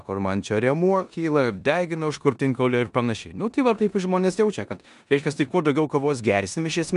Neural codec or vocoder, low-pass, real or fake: codec, 16 kHz in and 24 kHz out, 0.9 kbps, LongCat-Audio-Codec, four codebook decoder; 10.8 kHz; fake